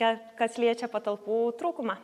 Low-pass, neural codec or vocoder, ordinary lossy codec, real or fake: 14.4 kHz; none; AAC, 96 kbps; real